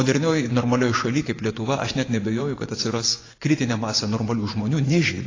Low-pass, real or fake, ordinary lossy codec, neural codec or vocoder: 7.2 kHz; fake; AAC, 32 kbps; vocoder, 24 kHz, 100 mel bands, Vocos